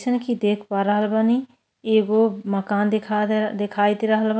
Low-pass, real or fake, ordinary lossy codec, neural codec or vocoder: none; real; none; none